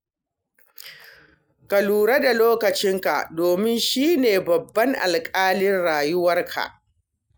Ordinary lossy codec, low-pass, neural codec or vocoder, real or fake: none; none; none; real